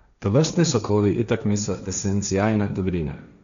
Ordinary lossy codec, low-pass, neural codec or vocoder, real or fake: none; 7.2 kHz; codec, 16 kHz, 1.1 kbps, Voila-Tokenizer; fake